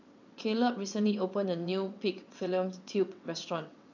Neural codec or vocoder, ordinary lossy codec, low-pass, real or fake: vocoder, 22.05 kHz, 80 mel bands, WaveNeXt; none; 7.2 kHz; fake